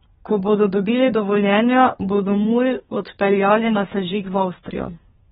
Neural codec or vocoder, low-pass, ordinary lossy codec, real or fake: codec, 32 kHz, 1.9 kbps, SNAC; 14.4 kHz; AAC, 16 kbps; fake